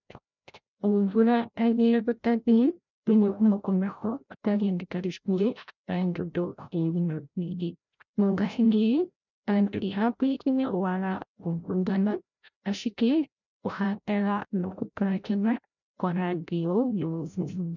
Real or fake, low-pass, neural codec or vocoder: fake; 7.2 kHz; codec, 16 kHz, 0.5 kbps, FreqCodec, larger model